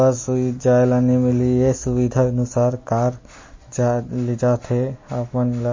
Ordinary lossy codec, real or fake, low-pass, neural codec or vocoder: MP3, 32 kbps; real; 7.2 kHz; none